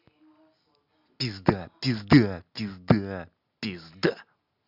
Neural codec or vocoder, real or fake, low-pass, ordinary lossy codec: none; real; 5.4 kHz; Opus, 64 kbps